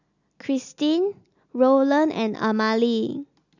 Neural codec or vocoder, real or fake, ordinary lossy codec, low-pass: none; real; none; 7.2 kHz